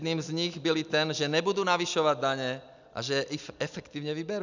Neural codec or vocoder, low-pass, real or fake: none; 7.2 kHz; real